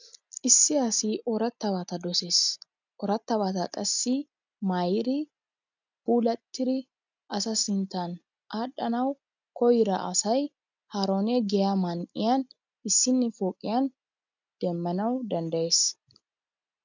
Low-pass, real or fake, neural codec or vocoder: 7.2 kHz; real; none